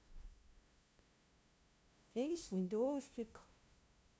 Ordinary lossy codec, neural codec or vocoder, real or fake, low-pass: none; codec, 16 kHz, 0.5 kbps, FunCodec, trained on LibriTTS, 25 frames a second; fake; none